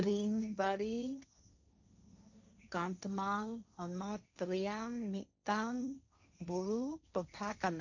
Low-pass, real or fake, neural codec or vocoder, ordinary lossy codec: 7.2 kHz; fake; codec, 16 kHz, 1.1 kbps, Voila-Tokenizer; none